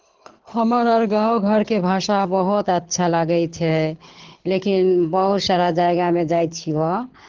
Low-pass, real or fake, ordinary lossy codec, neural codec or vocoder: 7.2 kHz; fake; Opus, 16 kbps; codec, 24 kHz, 6 kbps, HILCodec